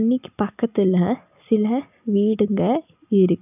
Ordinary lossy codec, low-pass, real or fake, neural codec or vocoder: none; 3.6 kHz; real; none